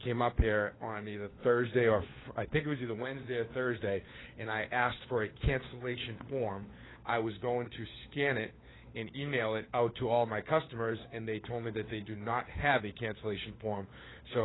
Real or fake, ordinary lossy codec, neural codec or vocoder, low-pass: fake; AAC, 16 kbps; codec, 16 kHz, 4 kbps, FunCodec, trained on LibriTTS, 50 frames a second; 7.2 kHz